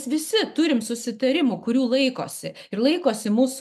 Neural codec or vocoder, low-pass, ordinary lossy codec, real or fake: none; 14.4 kHz; MP3, 96 kbps; real